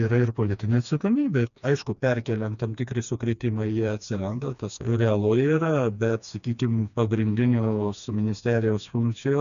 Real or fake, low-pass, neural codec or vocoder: fake; 7.2 kHz; codec, 16 kHz, 2 kbps, FreqCodec, smaller model